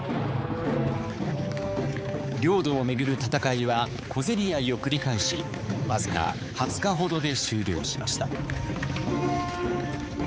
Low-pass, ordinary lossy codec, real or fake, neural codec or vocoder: none; none; fake; codec, 16 kHz, 4 kbps, X-Codec, HuBERT features, trained on balanced general audio